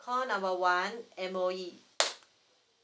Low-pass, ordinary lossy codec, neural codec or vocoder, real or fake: none; none; none; real